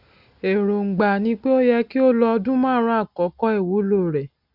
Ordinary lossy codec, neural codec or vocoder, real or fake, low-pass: none; none; real; 5.4 kHz